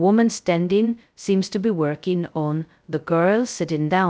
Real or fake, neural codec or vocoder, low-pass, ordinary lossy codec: fake; codec, 16 kHz, 0.2 kbps, FocalCodec; none; none